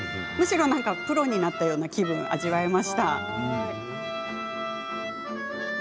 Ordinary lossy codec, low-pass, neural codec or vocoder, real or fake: none; none; none; real